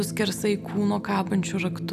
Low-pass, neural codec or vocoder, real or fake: 14.4 kHz; none; real